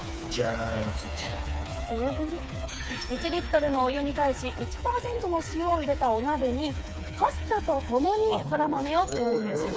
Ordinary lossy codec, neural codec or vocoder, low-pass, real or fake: none; codec, 16 kHz, 4 kbps, FreqCodec, smaller model; none; fake